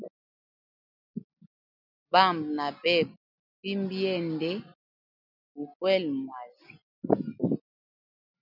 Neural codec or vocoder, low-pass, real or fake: none; 5.4 kHz; real